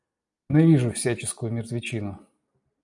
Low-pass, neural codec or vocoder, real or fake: 10.8 kHz; none; real